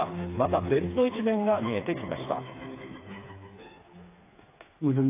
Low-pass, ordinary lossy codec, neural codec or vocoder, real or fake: 3.6 kHz; AAC, 24 kbps; codec, 16 kHz, 4 kbps, FreqCodec, smaller model; fake